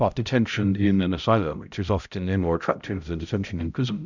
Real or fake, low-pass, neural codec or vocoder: fake; 7.2 kHz; codec, 16 kHz, 0.5 kbps, X-Codec, HuBERT features, trained on balanced general audio